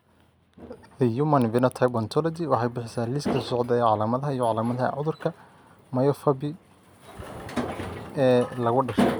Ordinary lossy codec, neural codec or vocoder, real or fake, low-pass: none; none; real; none